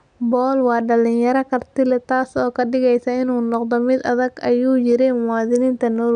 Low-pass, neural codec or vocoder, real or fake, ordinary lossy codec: 9.9 kHz; none; real; none